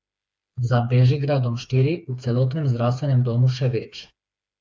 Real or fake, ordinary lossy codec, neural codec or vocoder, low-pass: fake; none; codec, 16 kHz, 4 kbps, FreqCodec, smaller model; none